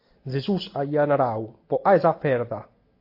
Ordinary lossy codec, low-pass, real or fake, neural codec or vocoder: AAC, 32 kbps; 5.4 kHz; real; none